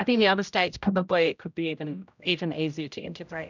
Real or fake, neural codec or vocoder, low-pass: fake; codec, 16 kHz, 0.5 kbps, X-Codec, HuBERT features, trained on general audio; 7.2 kHz